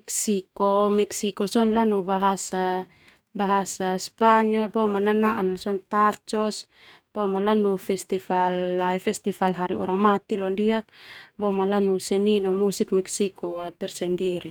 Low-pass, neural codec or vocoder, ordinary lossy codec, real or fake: none; codec, 44.1 kHz, 2.6 kbps, DAC; none; fake